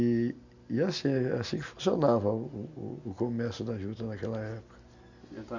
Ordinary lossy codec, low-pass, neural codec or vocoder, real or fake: none; 7.2 kHz; none; real